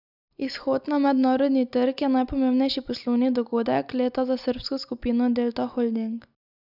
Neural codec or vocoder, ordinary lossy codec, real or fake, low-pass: none; none; real; 5.4 kHz